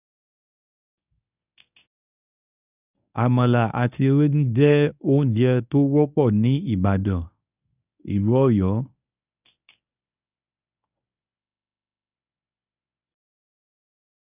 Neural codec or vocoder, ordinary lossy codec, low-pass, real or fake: codec, 24 kHz, 0.9 kbps, WavTokenizer, medium speech release version 1; none; 3.6 kHz; fake